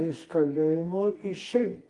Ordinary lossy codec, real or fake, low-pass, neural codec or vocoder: Opus, 24 kbps; fake; 10.8 kHz; codec, 24 kHz, 0.9 kbps, WavTokenizer, medium music audio release